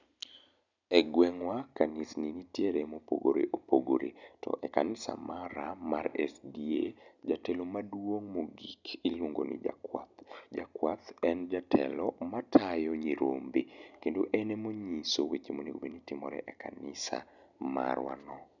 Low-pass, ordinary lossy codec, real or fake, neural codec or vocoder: 7.2 kHz; none; real; none